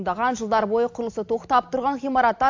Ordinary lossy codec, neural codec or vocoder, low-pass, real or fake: AAC, 48 kbps; none; 7.2 kHz; real